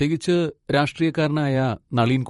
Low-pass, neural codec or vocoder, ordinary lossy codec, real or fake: 19.8 kHz; vocoder, 44.1 kHz, 128 mel bands, Pupu-Vocoder; MP3, 48 kbps; fake